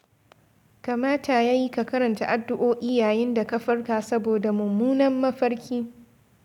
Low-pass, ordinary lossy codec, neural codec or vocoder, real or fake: 19.8 kHz; none; none; real